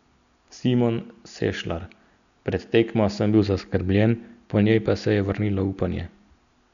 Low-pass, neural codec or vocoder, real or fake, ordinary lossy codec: 7.2 kHz; none; real; none